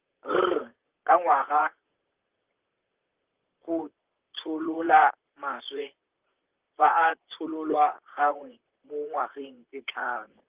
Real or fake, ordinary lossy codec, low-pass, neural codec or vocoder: fake; Opus, 24 kbps; 3.6 kHz; vocoder, 44.1 kHz, 128 mel bands, Pupu-Vocoder